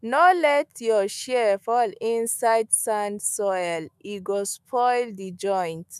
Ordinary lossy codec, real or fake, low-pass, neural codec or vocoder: none; fake; 14.4 kHz; autoencoder, 48 kHz, 128 numbers a frame, DAC-VAE, trained on Japanese speech